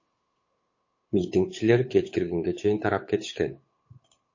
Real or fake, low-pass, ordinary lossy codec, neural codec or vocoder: fake; 7.2 kHz; MP3, 32 kbps; codec, 16 kHz, 8 kbps, FunCodec, trained on Chinese and English, 25 frames a second